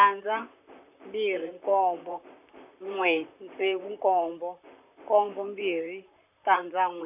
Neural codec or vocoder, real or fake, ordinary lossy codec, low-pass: vocoder, 44.1 kHz, 128 mel bands, Pupu-Vocoder; fake; none; 3.6 kHz